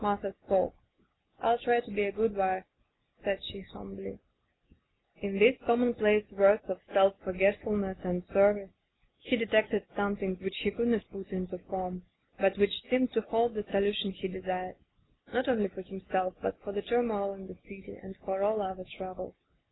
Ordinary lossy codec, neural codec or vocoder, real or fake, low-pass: AAC, 16 kbps; none; real; 7.2 kHz